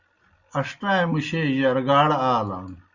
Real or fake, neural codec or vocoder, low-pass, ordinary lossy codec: real; none; 7.2 kHz; Opus, 64 kbps